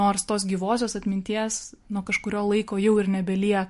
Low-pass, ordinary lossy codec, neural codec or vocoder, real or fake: 14.4 kHz; MP3, 48 kbps; none; real